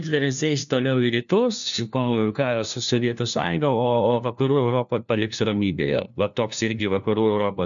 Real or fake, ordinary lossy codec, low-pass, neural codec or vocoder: fake; MP3, 96 kbps; 7.2 kHz; codec, 16 kHz, 1 kbps, FunCodec, trained on LibriTTS, 50 frames a second